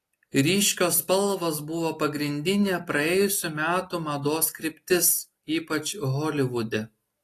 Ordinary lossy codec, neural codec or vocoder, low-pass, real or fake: AAC, 48 kbps; none; 14.4 kHz; real